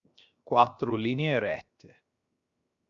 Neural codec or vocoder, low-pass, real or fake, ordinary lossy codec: codec, 16 kHz, 0.7 kbps, FocalCodec; 7.2 kHz; fake; Opus, 64 kbps